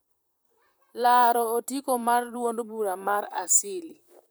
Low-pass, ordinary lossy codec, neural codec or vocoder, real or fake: none; none; vocoder, 44.1 kHz, 128 mel bands, Pupu-Vocoder; fake